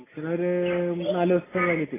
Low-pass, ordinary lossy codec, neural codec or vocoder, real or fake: 3.6 kHz; AAC, 16 kbps; none; real